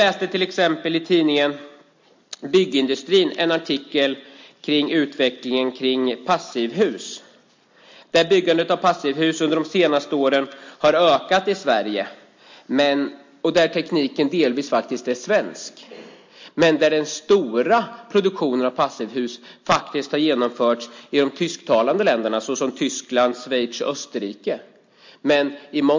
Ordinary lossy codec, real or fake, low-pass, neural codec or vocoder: MP3, 48 kbps; real; 7.2 kHz; none